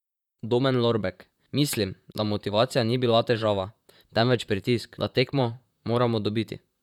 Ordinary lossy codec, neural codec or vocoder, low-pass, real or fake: none; vocoder, 44.1 kHz, 128 mel bands, Pupu-Vocoder; 19.8 kHz; fake